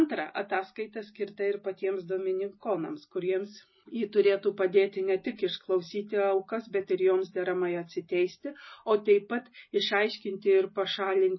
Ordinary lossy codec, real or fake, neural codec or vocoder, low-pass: MP3, 24 kbps; real; none; 7.2 kHz